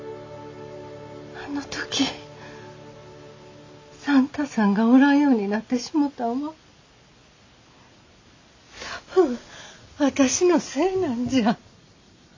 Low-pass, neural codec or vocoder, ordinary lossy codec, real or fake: 7.2 kHz; none; AAC, 48 kbps; real